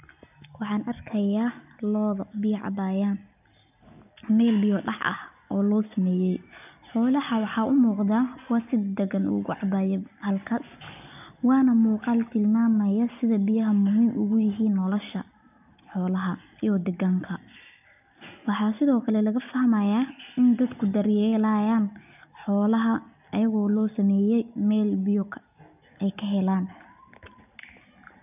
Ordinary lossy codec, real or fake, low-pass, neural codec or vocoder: none; real; 3.6 kHz; none